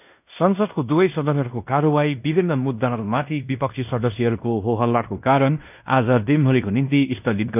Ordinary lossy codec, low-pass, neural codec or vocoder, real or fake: none; 3.6 kHz; codec, 16 kHz in and 24 kHz out, 0.9 kbps, LongCat-Audio-Codec, fine tuned four codebook decoder; fake